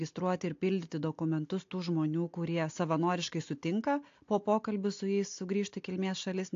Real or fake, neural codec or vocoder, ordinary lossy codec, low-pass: real; none; AAC, 48 kbps; 7.2 kHz